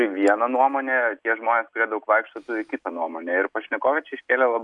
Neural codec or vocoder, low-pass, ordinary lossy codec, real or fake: none; 10.8 kHz; MP3, 96 kbps; real